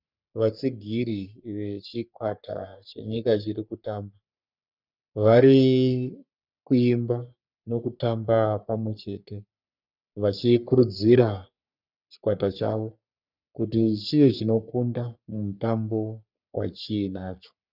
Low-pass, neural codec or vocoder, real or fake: 5.4 kHz; codec, 44.1 kHz, 3.4 kbps, Pupu-Codec; fake